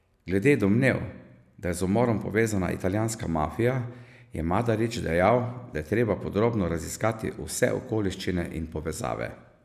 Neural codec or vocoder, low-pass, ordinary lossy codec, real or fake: none; 14.4 kHz; none; real